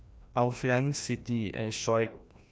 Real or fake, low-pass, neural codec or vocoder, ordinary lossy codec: fake; none; codec, 16 kHz, 1 kbps, FreqCodec, larger model; none